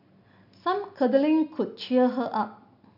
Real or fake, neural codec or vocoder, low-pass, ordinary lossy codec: real; none; 5.4 kHz; AAC, 48 kbps